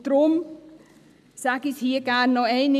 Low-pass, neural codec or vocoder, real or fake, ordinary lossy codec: 14.4 kHz; none; real; none